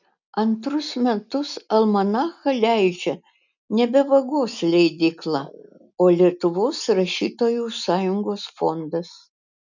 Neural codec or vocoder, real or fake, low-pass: none; real; 7.2 kHz